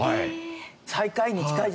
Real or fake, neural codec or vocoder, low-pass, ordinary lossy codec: real; none; none; none